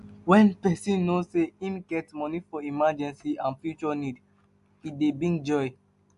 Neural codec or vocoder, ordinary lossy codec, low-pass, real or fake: none; AAC, 96 kbps; 10.8 kHz; real